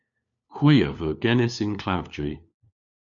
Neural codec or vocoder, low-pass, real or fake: codec, 16 kHz, 4 kbps, FunCodec, trained on LibriTTS, 50 frames a second; 7.2 kHz; fake